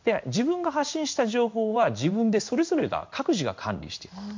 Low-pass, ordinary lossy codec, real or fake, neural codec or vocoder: 7.2 kHz; MP3, 48 kbps; fake; codec, 16 kHz in and 24 kHz out, 1 kbps, XY-Tokenizer